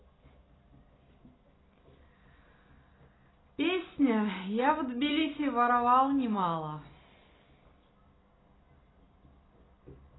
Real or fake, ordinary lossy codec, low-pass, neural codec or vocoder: real; AAC, 16 kbps; 7.2 kHz; none